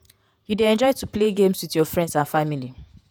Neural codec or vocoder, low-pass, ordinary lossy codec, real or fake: vocoder, 48 kHz, 128 mel bands, Vocos; none; none; fake